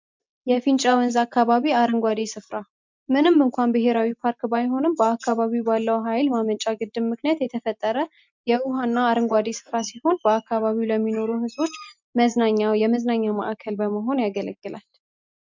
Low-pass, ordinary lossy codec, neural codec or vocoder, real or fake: 7.2 kHz; MP3, 64 kbps; none; real